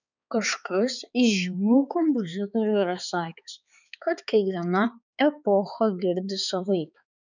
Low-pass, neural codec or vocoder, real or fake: 7.2 kHz; codec, 16 kHz, 4 kbps, X-Codec, HuBERT features, trained on balanced general audio; fake